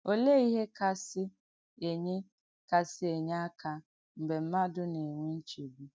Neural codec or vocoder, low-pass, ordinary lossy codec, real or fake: none; none; none; real